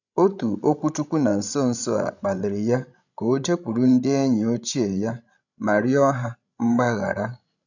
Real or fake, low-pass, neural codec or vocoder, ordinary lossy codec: fake; 7.2 kHz; codec, 16 kHz, 16 kbps, FreqCodec, larger model; none